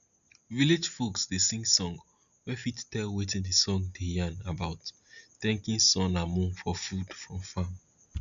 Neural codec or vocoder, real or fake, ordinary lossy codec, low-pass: none; real; none; 7.2 kHz